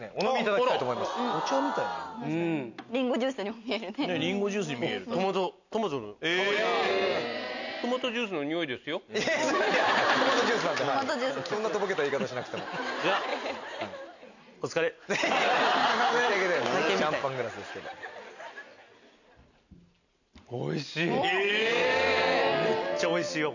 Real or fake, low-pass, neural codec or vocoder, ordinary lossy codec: real; 7.2 kHz; none; none